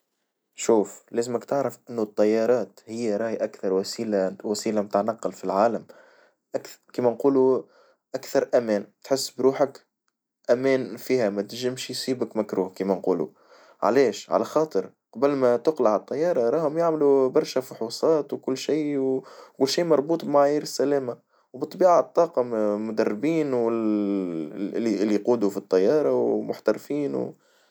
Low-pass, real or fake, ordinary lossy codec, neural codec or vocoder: none; real; none; none